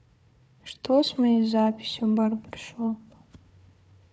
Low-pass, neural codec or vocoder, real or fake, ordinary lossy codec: none; codec, 16 kHz, 4 kbps, FunCodec, trained on Chinese and English, 50 frames a second; fake; none